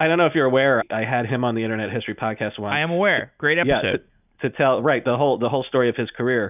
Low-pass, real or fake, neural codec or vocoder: 3.6 kHz; real; none